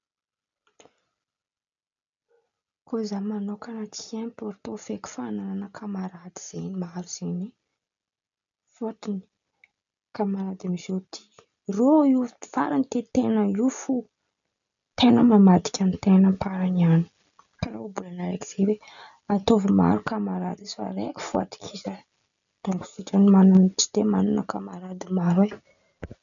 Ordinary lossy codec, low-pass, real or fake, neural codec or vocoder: none; 7.2 kHz; real; none